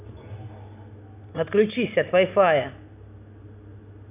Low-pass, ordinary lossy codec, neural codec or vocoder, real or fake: 3.6 kHz; none; none; real